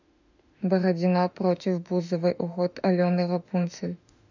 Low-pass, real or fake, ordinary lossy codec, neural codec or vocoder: 7.2 kHz; fake; none; autoencoder, 48 kHz, 32 numbers a frame, DAC-VAE, trained on Japanese speech